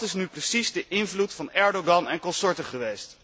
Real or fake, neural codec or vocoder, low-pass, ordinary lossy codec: real; none; none; none